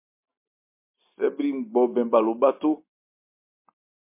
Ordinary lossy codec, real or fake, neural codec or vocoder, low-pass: MP3, 24 kbps; real; none; 3.6 kHz